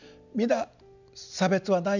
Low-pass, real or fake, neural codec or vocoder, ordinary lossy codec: 7.2 kHz; real; none; none